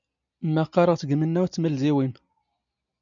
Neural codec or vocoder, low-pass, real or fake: none; 7.2 kHz; real